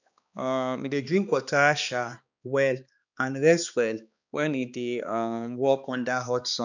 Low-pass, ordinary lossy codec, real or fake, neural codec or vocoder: 7.2 kHz; none; fake; codec, 16 kHz, 2 kbps, X-Codec, HuBERT features, trained on balanced general audio